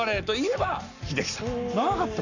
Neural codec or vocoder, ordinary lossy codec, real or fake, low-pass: codec, 44.1 kHz, 7.8 kbps, Pupu-Codec; none; fake; 7.2 kHz